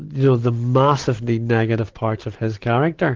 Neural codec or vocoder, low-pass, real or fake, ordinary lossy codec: none; 7.2 kHz; real; Opus, 16 kbps